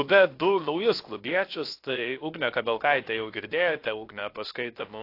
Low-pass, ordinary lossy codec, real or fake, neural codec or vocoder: 5.4 kHz; AAC, 32 kbps; fake; codec, 16 kHz, 0.7 kbps, FocalCodec